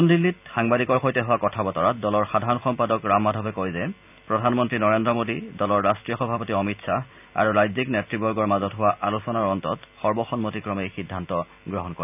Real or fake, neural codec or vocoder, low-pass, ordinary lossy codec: real; none; 3.6 kHz; none